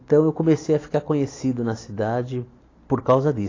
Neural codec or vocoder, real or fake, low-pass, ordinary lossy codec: none; real; 7.2 kHz; AAC, 32 kbps